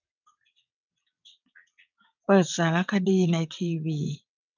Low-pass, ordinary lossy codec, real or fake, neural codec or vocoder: 7.2 kHz; none; fake; vocoder, 22.05 kHz, 80 mel bands, WaveNeXt